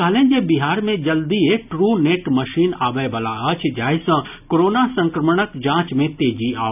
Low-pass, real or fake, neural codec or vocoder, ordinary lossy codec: 3.6 kHz; real; none; none